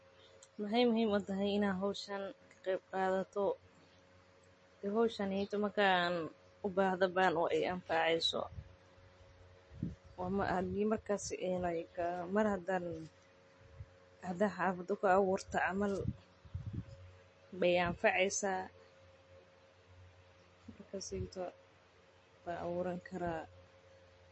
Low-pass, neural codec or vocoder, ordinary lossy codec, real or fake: 9.9 kHz; none; MP3, 32 kbps; real